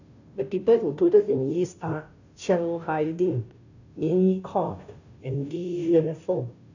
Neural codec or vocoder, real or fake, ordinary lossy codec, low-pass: codec, 16 kHz, 0.5 kbps, FunCodec, trained on Chinese and English, 25 frames a second; fake; none; 7.2 kHz